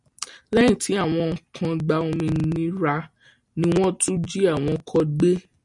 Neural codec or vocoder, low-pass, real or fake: none; 10.8 kHz; real